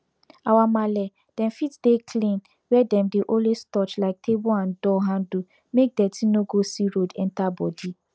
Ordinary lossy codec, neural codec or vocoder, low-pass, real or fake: none; none; none; real